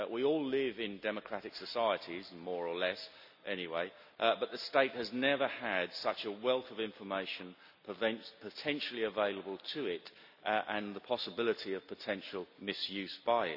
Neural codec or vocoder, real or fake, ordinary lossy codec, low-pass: none; real; none; 5.4 kHz